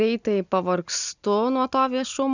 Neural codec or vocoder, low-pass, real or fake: none; 7.2 kHz; real